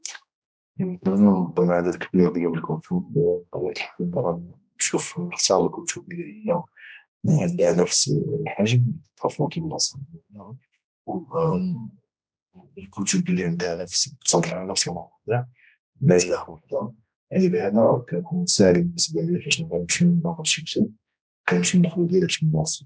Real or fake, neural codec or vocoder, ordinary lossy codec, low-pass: fake; codec, 16 kHz, 1 kbps, X-Codec, HuBERT features, trained on general audio; none; none